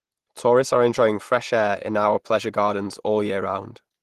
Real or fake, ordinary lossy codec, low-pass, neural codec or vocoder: real; Opus, 16 kbps; 14.4 kHz; none